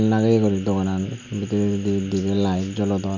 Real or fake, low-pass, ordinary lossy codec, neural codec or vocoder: real; 7.2 kHz; none; none